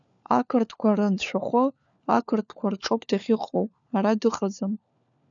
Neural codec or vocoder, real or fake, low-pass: codec, 16 kHz, 4 kbps, FunCodec, trained on LibriTTS, 50 frames a second; fake; 7.2 kHz